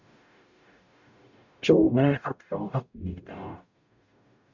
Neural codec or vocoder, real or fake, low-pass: codec, 44.1 kHz, 0.9 kbps, DAC; fake; 7.2 kHz